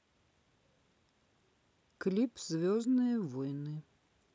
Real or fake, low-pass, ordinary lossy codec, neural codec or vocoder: real; none; none; none